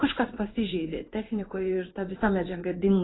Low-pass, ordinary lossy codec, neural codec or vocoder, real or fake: 7.2 kHz; AAC, 16 kbps; codec, 16 kHz in and 24 kHz out, 1 kbps, XY-Tokenizer; fake